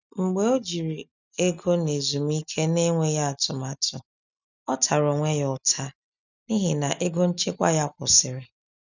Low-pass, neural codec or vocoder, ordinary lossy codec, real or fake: 7.2 kHz; none; none; real